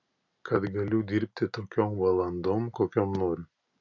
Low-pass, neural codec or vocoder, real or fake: 7.2 kHz; none; real